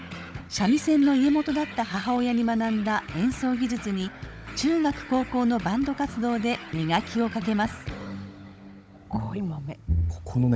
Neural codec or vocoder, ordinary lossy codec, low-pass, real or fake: codec, 16 kHz, 16 kbps, FunCodec, trained on Chinese and English, 50 frames a second; none; none; fake